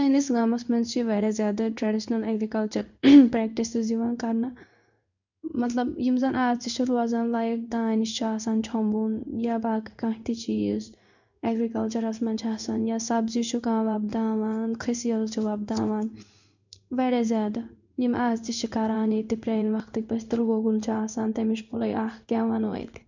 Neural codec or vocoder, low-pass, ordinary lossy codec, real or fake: codec, 16 kHz in and 24 kHz out, 1 kbps, XY-Tokenizer; 7.2 kHz; none; fake